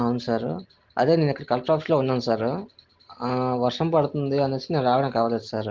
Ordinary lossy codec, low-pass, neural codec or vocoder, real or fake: Opus, 16 kbps; 7.2 kHz; none; real